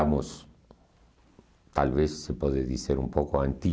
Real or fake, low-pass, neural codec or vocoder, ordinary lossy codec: real; none; none; none